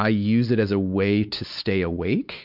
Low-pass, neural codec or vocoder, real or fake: 5.4 kHz; codec, 16 kHz, 4 kbps, X-Codec, WavLM features, trained on Multilingual LibriSpeech; fake